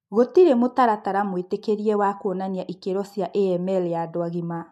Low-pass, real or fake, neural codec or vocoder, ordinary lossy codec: 14.4 kHz; real; none; MP3, 64 kbps